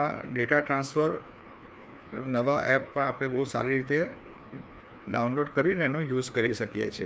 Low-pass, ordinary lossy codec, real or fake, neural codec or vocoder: none; none; fake; codec, 16 kHz, 2 kbps, FreqCodec, larger model